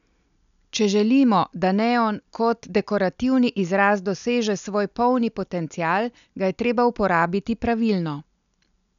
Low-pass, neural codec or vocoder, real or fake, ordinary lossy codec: 7.2 kHz; none; real; none